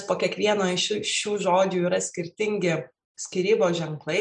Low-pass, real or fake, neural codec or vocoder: 9.9 kHz; real; none